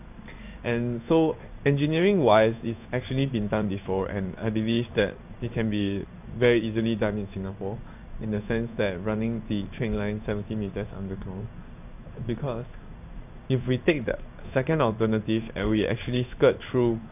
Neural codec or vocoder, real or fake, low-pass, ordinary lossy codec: codec, 16 kHz in and 24 kHz out, 1 kbps, XY-Tokenizer; fake; 3.6 kHz; none